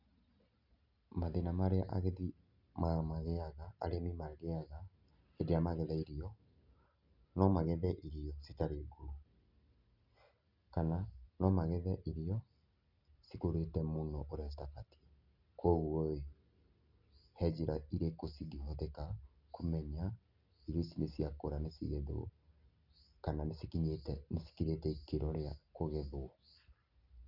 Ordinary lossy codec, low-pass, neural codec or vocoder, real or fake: none; 5.4 kHz; none; real